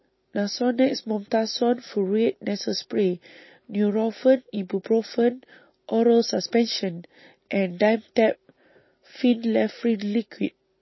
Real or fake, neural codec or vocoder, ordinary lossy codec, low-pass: real; none; MP3, 24 kbps; 7.2 kHz